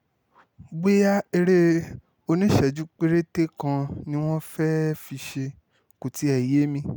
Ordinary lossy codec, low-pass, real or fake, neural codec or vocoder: none; none; real; none